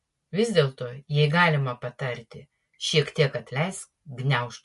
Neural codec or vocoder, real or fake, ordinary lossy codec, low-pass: none; real; MP3, 48 kbps; 14.4 kHz